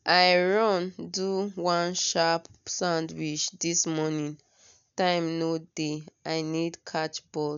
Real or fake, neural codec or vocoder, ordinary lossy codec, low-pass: real; none; none; 7.2 kHz